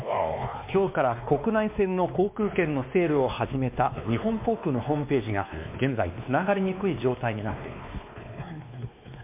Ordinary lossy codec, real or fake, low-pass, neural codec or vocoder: MP3, 32 kbps; fake; 3.6 kHz; codec, 16 kHz, 2 kbps, X-Codec, WavLM features, trained on Multilingual LibriSpeech